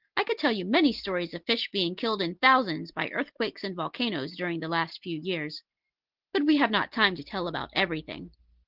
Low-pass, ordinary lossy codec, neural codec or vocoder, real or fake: 5.4 kHz; Opus, 16 kbps; none; real